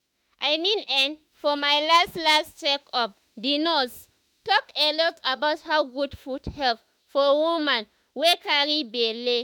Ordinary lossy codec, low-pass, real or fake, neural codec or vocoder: none; none; fake; autoencoder, 48 kHz, 32 numbers a frame, DAC-VAE, trained on Japanese speech